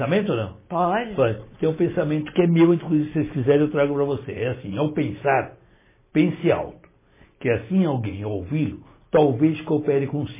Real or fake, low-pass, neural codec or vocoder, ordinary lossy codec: real; 3.6 kHz; none; MP3, 16 kbps